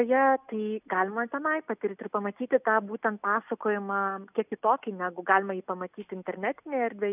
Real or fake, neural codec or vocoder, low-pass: real; none; 3.6 kHz